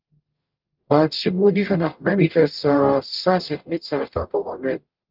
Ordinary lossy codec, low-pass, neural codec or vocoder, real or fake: Opus, 24 kbps; 5.4 kHz; codec, 44.1 kHz, 0.9 kbps, DAC; fake